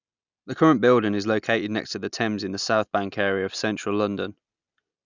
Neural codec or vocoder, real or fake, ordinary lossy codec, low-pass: none; real; none; 7.2 kHz